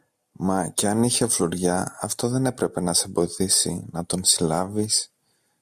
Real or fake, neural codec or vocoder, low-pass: real; none; 14.4 kHz